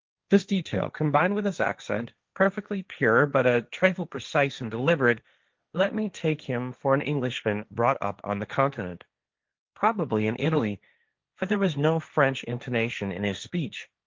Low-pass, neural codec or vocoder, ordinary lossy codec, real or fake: 7.2 kHz; codec, 16 kHz, 1.1 kbps, Voila-Tokenizer; Opus, 32 kbps; fake